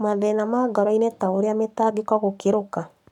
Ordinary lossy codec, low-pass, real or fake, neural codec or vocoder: none; 19.8 kHz; fake; codec, 44.1 kHz, 7.8 kbps, Pupu-Codec